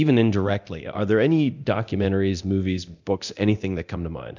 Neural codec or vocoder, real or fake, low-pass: codec, 24 kHz, 0.9 kbps, DualCodec; fake; 7.2 kHz